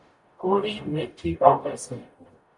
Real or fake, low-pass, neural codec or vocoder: fake; 10.8 kHz; codec, 44.1 kHz, 0.9 kbps, DAC